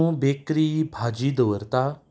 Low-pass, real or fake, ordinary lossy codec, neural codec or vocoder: none; real; none; none